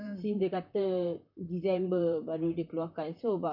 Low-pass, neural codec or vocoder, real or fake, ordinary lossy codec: 5.4 kHz; vocoder, 44.1 kHz, 128 mel bands, Pupu-Vocoder; fake; MP3, 48 kbps